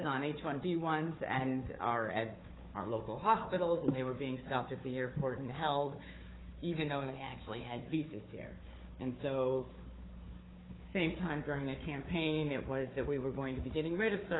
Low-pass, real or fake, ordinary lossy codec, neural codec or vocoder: 7.2 kHz; fake; AAC, 16 kbps; codec, 16 kHz, 4 kbps, FunCodec, trained on Chinese and English, 50 frames a second